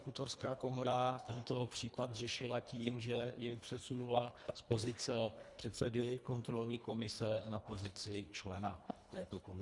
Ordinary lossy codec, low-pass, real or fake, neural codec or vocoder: Opus, 64 kbps; 10.8 kHz; fake; codec, 24 kHz, 1.5 kbps, HILCodec